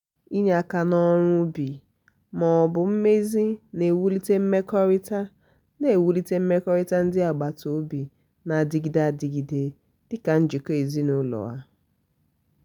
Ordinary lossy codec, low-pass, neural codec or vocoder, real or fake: none; 19.8 kHz; none; real